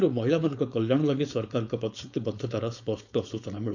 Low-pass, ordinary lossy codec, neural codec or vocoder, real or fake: 7.2 kHz; none; codec, 16 kHz, 4.8 kbps, FACodec; fake